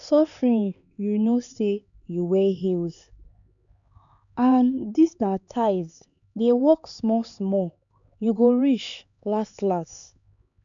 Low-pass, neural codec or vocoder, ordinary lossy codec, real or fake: 7.2 kHz; codec, 16 kHz, 4 kbps, X-Codec, HuBERT features, trained on LibriSpeech; none; fake